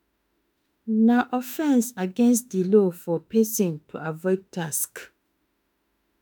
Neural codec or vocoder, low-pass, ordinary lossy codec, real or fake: autoencoder, 48 kHz, 32 numbers a frame, DAC-VAE, trained on Japanese speech; none; none; fake